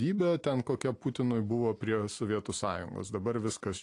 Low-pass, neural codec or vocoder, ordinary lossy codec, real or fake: 10.8 kHz; none; AAC, 48 kbps; real